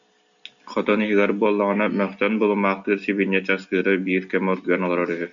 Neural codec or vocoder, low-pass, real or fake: none; 7.2 kHz; real